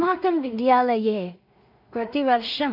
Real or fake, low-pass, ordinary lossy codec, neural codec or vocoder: fake; 5.4 kHz; none; codec, 16 kHz in and 24 kHz out, 0.4 kbps, LongCat-Audio-Codec, two codebook decoder